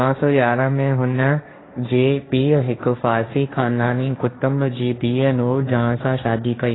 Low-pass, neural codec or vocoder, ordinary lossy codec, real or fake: 7.2 kHz; codec, 16 kHz, 1.1 kbps, Voila-Tokenizer; AAC, 16 kbps; fake